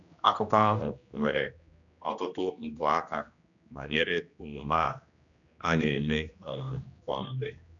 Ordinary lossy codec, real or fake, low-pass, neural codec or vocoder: none; fake; 7.2 kHz; codec, 16 kHz, 1 kbps, X-Codec, HuBERT features, trained on general audio